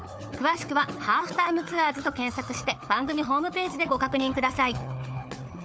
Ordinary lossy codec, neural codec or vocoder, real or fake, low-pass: none; codec, 16 kHz, 4 kbps, FunCodec, trained on Chinese and English, 50 frames a second; fake; none